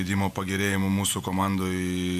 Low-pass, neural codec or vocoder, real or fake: 14.4 kHz; vocoder, 48 kHz, 128 mel bands, Vocos; fake